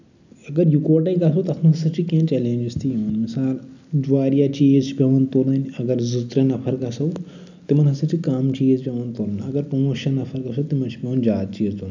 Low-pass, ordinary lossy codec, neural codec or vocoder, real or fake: 7.2 kHz; none; none; real